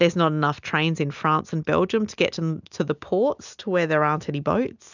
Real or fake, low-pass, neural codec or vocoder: real; 7.2 kHz; none